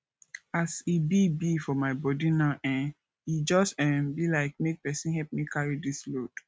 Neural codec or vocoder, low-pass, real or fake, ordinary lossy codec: none; none; real; none